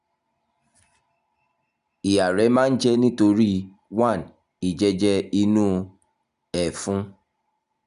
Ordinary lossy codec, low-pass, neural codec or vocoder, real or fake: none; 10.8 kHz; none; real